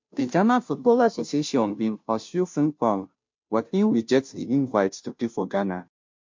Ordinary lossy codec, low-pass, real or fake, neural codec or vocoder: MP3, 48 kbps; 7.2 kHz; fake; codec, 16 kHz, 0.5 kbps, FunCodec, trained on Chinese and English, 25 frames a second